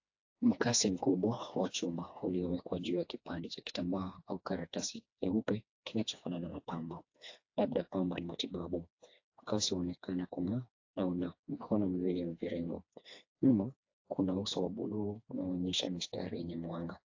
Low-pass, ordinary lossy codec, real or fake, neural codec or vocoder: 7.2 kHz; AAC, 48 kbps; fake; codec, 16 kHz, 2 kbps, FreqCodec, smaller model